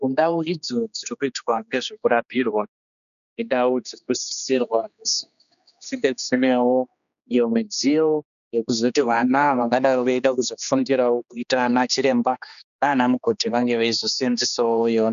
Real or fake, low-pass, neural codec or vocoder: fake; 7.2 kHz; codec, 16 kHz, 2 kbps, X-Codec, HuBERT features, trained on general audio